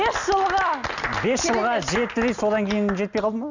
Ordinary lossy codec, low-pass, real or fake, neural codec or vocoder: none; 7.2 kHz; real; none